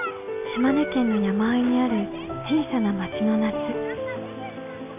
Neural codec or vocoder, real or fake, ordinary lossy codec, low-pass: none; real; none; 3.6 kHz